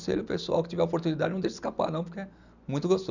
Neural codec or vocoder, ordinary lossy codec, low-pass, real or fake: none; none; 7.2 kHz; real